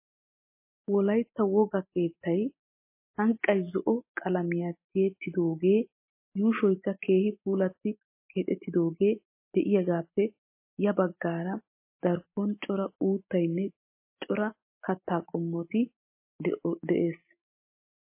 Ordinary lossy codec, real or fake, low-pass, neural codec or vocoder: MP3, 24 kbps; real; 3.6 kHz; none